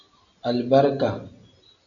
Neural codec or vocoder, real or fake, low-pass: none; real; 7.2 kHz